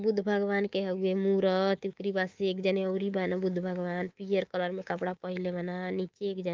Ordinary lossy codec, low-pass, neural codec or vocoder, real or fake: Opus, 24 kbps; 7.2 kHz; codec, 24 kHz, 3.1 kbps, DualCodec; fake